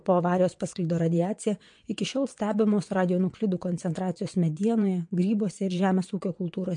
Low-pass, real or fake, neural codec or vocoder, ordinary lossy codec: 9.9 kHz; fake; vocoder, 22.05 kHz, 80 mel bands, WaveNeXt; MP3, 48 kbps